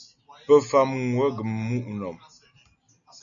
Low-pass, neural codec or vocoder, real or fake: 7.2 kHz; none; real